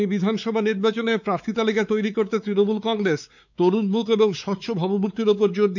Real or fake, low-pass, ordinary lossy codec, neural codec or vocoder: fake; 7.2 kHz; none; codec, 16 kHz, 4 kbps, X-Codec, WavLM features, trained on Multilingual LibriSpeech